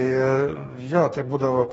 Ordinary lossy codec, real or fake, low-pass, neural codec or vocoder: AAC, 24 kbps; fake; 19.8 kHz; codec, 44.1 kHz, 2.6 kbps, DAC